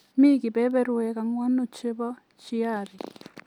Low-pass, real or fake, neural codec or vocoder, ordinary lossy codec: 19.8 kHz; real; none; none